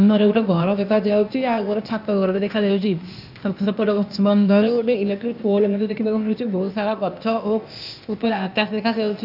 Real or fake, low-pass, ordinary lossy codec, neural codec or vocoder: fake; 5.4 kHz; none; codec, 16 kHz, 0.8 kbps, ZipCodec